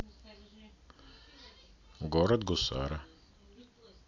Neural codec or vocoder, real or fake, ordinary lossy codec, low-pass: none; real; AAC, 48 kbps; 7.2 kHz